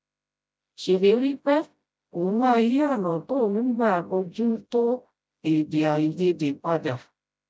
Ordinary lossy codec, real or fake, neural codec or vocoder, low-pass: none; fake; codec, 16 kHz, 0.5 kbps, FreqCodec, smaller model; none